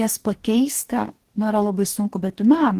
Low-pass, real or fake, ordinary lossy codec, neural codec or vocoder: 14.4 kHz; fake; Opus, 16 kbps; codec, 44.1 kHz, 2.6 kbps, DAC